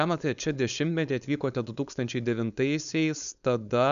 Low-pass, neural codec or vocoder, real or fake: 7.2 kHz; codec, 16 kHz, 4.8 kbps, FACodec; fake